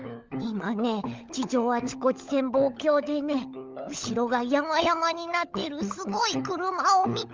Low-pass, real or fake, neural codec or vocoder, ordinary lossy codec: 7.2 kHz; fake; codec, 16 kHz, 16 kbps, FunCodec, trained on LibriTTS, 50 frames a second; Opus, 32 kbps